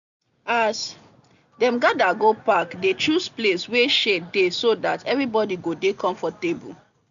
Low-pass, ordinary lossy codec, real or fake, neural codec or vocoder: 7.2 kHz; none; real; none